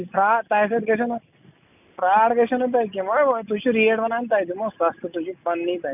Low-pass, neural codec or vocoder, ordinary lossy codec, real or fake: 3.6 kHz; none; none; real